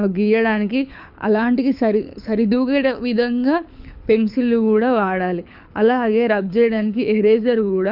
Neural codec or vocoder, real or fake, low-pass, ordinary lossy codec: codec, 24 kHz, 6 kbps, HILCodec; fake; 5.4 kHz; none